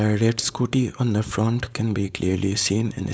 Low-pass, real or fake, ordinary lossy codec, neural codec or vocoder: none; fake; none; codec, 16 kHz, 4.8 kbps, FACodec